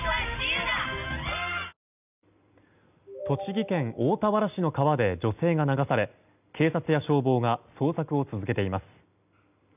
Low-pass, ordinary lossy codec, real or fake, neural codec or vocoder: 3.6 kHz; none; real; none